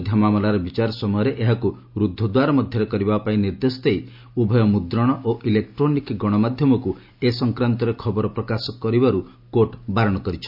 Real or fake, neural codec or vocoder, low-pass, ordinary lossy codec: real; none; 5.4 kHz; MP3, 48 kbps